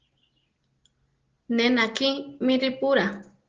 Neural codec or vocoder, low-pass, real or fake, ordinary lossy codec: none; 7.2 kHz; real; Opus, 16 kbps